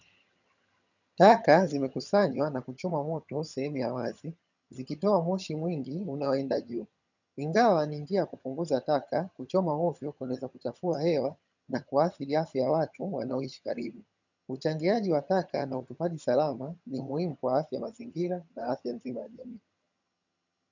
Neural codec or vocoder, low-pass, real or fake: vocoder, 22.05 kHz, 80 mel bands, HiFi-GAN; 7.2 kHz; fake